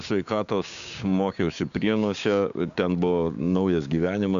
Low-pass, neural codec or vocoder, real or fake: 7.2 kHz; codec, 16 kHz, 6 kbps, DAC; fake